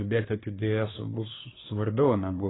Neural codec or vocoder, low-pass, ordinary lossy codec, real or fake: codec, 24 kHz, 1 kbps, SNAC; 7.2 kHz; AAC, 16 kbps; fake